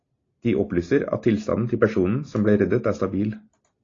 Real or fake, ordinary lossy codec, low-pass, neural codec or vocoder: real; AAC, 32 kbps; 7.2 kHz; none